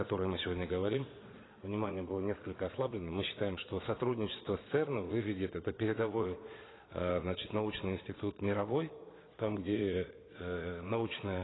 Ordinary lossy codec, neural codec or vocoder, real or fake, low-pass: AAC, 16 kbps; vocoder, 22.05 kHz, 80 mel bands, Vocos; fake; 7.2 kHz